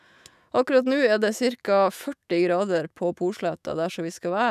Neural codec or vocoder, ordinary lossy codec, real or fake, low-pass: autoencoder, 48 kHz, 128 numbers a frame, DAC-VAE, trained on Japanese speech; none; fake; 14.4 kHz